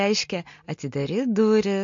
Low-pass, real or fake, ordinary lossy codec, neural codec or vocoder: 7.2 kHz; real; MP3, 48 kbps; none